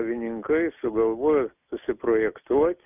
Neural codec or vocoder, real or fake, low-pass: none; real; 3.6 kHz